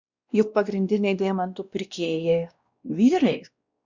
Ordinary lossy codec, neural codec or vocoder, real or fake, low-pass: Opus, 64 kbps; codec, 16 kHz, 1 kbps, X-Codec, WavLM features, trained on Multilingual LibriSpeech; fake; 7.2 kHz